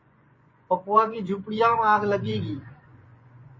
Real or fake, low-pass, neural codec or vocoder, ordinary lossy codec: fake; 7.2 kHz; vocoder, 24 kHz, 100 mel bands, Vocos; MP3, 32 kbps